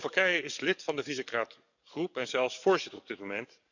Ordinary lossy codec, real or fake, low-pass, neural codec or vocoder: none; fake; 7.2 kHz; codec, 44.1 kHz, 7.8 kbps, DAC